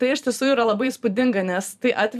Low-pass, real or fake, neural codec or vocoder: 14.4 kHz; fake; vocoder, 44.1 kHz, 128 mel bands every 512 samples, BigVGAN v2